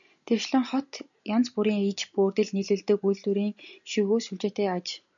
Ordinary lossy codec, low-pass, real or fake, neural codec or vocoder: MP3, 96 kbps; 7.2 kHz; real; none